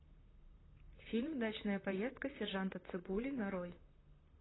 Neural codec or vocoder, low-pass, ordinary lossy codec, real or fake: vocoder, 44.1 kHz, 128 mel bands, Pupu-Vocoder; 7.2 kHz; AAC, 16 kbps; fake